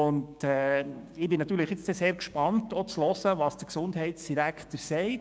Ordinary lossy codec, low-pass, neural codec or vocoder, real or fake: none; none; codec, 16 kHz, 6 kbps, DAC; fake